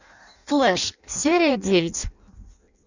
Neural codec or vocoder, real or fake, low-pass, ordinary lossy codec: codec, 16 kHz in and 24 kHz out, 0.6 kbps, FireRedTTS-2 codec; fake; 7.2 kHz; Opus, 64 kbps